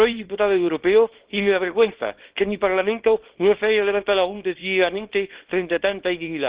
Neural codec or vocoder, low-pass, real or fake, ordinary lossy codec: codec, 24 kHz, 0.9 kbps, WavTokenizer, small release; 3.6 kHz; fake; Opus, 16 kbps